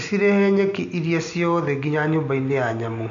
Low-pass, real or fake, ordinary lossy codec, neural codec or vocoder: 7.2 kHz; real; none; none